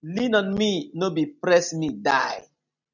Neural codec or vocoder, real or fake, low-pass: none; real; 7.2 kHz